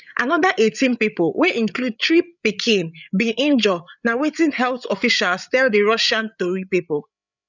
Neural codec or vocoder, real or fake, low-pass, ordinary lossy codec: codec, 16 kHz, 8 kbps, FreqCodec, larger model; fake; 7.2 kHz; none